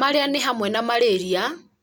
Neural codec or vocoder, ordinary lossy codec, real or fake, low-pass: none; none; real; none